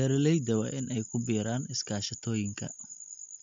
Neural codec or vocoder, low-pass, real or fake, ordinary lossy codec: none; 7.2 kHz; real; MP3, 48 kbps